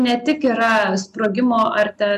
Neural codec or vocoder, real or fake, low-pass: none; real; 14.4 kHz